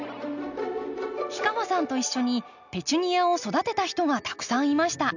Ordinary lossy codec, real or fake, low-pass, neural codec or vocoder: none; real; 7.2 kHz; none